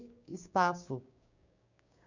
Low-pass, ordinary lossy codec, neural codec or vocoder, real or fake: 7.2 kHz; none; codec, 16 kHz, 6 kbps, DAC; fake